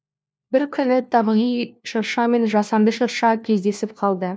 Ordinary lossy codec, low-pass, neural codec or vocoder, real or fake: none; none; codec, 16 kHz, 1 kbps, FunCodec, trained on LibriTTS, 50 frames a second; fake